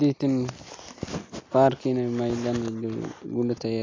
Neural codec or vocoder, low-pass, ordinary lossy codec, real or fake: none; 7.2 kHz; AAC, 32 kbps; real